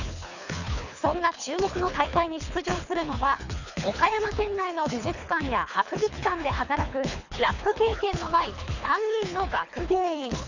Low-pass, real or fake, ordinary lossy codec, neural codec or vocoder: 7.2 kHz; fake; none; codec, 24 kHz, 3 kbps, HILCodec